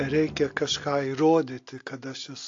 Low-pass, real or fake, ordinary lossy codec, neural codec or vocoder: 7.2 kHz; real; AAC, 48 kbps; none